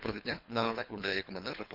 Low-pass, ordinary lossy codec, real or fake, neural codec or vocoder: 5.4 kHz; none; fake; codec, 24 kHz, 3 kbps, HILCodec